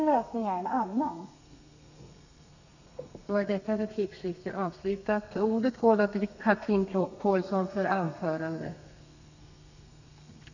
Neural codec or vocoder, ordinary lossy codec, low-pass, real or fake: codec, 32 kHz, 1.9 kbps, SNAC; none; 7.2 kHz; fake